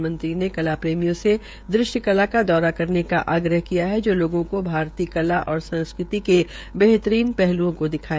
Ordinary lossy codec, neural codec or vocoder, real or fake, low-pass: none; codec, 16 kHz, 16 kbps, FreqCodec, smaller model; fake; none